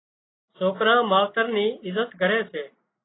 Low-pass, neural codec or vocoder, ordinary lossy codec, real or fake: 7.2 kHz; none; AAC, 16 kbps; real